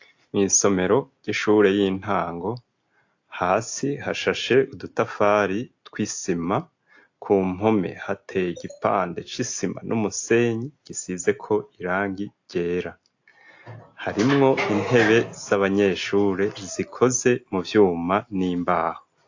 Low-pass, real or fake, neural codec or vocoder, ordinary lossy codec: 7.2 kHz; real; none; AAC, 48 kbps